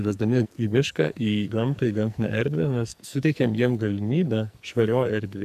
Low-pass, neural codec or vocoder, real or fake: 14.4 kHz; codec, 44.1 kHz, 2.6 kbps, SNAC; fake